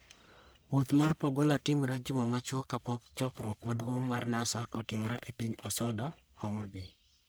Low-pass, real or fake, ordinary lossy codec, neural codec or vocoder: none; fake; none; codec, 44.1 kHz, 1.7 kbps, Pupu-Codec